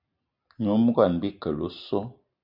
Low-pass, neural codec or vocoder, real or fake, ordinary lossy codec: 5.4 kHz; none; real; MP3, 48 kbps